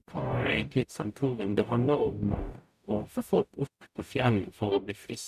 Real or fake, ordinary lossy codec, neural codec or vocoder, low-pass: fake; none; codec, 44.1 kHz, 0.9 kbps, DAC; 14.4 kHz